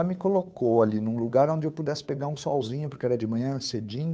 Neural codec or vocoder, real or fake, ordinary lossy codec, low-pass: codec, 16 kHz, 2 kbps, FunCodec, trained on Chinese and English, 25 frames a second; fake; none; none